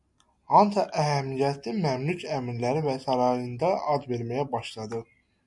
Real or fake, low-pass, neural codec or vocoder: real; 10.8 kHz; none